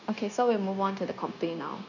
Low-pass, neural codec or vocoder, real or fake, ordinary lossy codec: 7.2 kHz; codec, 16 kHz, 0.9 kbps, LongCat-Audio-Codec; fake; none